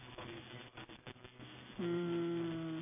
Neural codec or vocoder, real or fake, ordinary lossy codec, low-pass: vocoder, 44.1 kHz, 128 mel bands every 256 samples, BigVGAN v2; fake; AAC, 32 kbps; 3.6 kHz